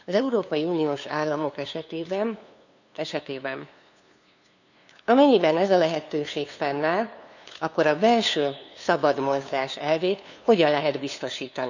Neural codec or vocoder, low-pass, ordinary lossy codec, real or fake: codec, 16 kHz, 2 kbps, FunCodec, trained on LibriTTS, 25 frames a second; 7.2 kHz; none; fake